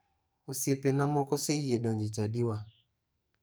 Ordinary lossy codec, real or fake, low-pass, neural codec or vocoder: none; fake; none; codec, 44.1 kHz, 2.6 kbps, SNAC